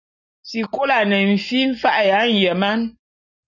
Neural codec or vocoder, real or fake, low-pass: none; real; 7.2 kHz